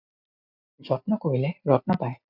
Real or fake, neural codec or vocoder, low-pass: real; none; 5.4 kHz